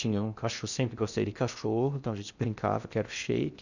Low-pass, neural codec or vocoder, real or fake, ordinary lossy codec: 7.2 kHz; codec, 16 kHz in and 24 kHz out, 0.6 kbps, FocalCodec, streaming, 2048 codes; fake; none